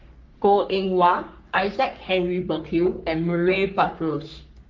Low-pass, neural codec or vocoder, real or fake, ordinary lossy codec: 7.2 kHz; codec, 44.1 kHz, 3.4 kbps, Pupu-Codec; fake; Opus, 24 kbps